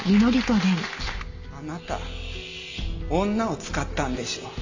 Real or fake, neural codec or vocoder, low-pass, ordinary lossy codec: real; none; 7.2 kHz; AAC, 48 kbps